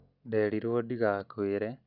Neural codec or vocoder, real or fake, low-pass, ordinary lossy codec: none; real; 5.4 kHz; none